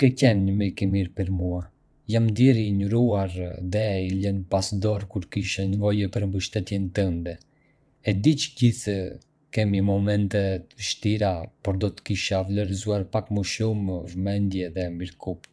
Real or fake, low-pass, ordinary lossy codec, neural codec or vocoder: fake; none; none; vocoder, 22.05 kHz, 80 mel bands, WaveNeXt